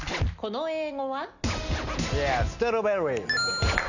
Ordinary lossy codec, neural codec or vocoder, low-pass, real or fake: none; none; 7.2 kHz; real